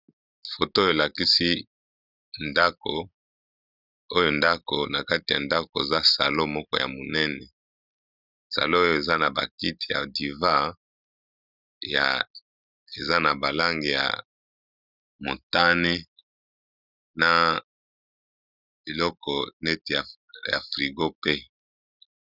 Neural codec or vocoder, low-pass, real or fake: none; 5.4 kHz; real